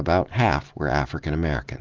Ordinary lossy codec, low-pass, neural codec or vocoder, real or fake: Opus, 16 kbps; 7.2 kHz; none; real